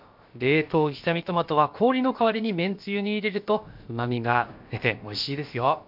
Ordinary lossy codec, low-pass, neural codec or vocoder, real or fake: MP3, 48 kbps; 5.4 kHz; codec, 16 kHz, about 1 kbps, DyCAST, with the encoder's durations; fake